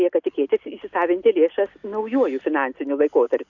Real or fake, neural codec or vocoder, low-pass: real; none; 7.2 kHz